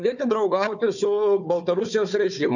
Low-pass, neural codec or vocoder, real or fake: 7.2 kHz; codec, 16 kHz, 4 kbps, FunCodec, trained on Chinese and English, 50 frames a second; fake